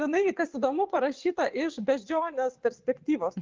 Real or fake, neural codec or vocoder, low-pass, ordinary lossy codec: fake; vocoder, 22.05 kHz, 80 mel bands, WaveNeXt; 7.2 kHz; Opus, 32 kbps